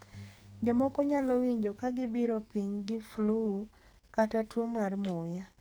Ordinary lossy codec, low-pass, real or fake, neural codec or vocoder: none; none; fake; codec, 44.1 kHz, 2.6 kbps, SNAC